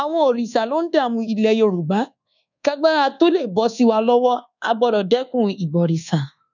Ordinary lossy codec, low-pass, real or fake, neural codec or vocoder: none; 7.2 kHz; fake; codec, 24 kHz, 1.2 kbps, DualCodec